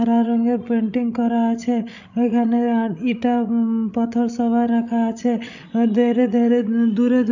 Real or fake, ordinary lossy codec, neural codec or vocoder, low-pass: fake; none; codec, 16 kHz, 16 kbps, FreqCodec, larger model; 7.2 kHz